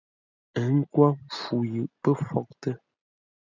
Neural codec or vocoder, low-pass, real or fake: none; 7.2 kHz; real